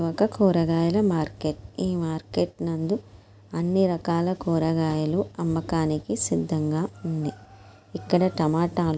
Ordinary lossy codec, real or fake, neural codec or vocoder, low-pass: none; real; none; none